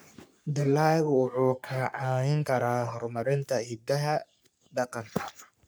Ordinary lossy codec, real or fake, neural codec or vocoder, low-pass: none; fake; codec, 44.1 kHz, 3.4 kbps, Pupu-Codec; none